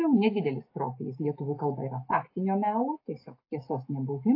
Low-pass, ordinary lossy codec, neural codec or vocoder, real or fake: 5.4 kHz; AAC, 32 kbps; none; real